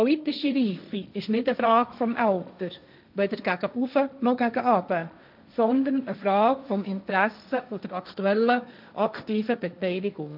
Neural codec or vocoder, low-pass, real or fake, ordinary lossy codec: codec, 16 kHz, 1.1 kbps, Voila-Tokenizer; 5.4 kHz; fake; none